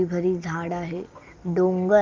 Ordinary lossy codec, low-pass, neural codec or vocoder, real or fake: Opus, 32 kbps; 7.2 kHz; none; real